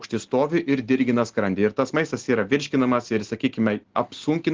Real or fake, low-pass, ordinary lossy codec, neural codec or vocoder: real; 7.2 kHz; Opus, 16 kbps; none